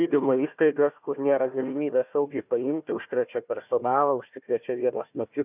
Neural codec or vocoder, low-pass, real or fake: codec, 16 kHz, 1 kbps, FunCodec, trained on Chinese and English, 50 frames a second; 3.6 kHz; fake